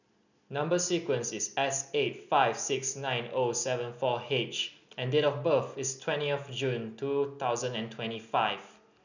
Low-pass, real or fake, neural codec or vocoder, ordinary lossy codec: 7.2 kHz; real; none; none